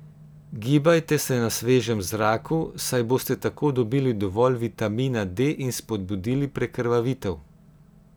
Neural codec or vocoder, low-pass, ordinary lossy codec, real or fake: none; none; none; real